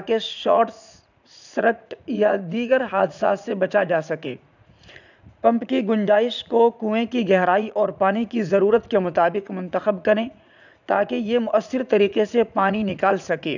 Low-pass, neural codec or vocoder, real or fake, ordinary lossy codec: 7.2 kHz; vocoder, 44.1 kHz, 80 mel bands, Vocos; fake; none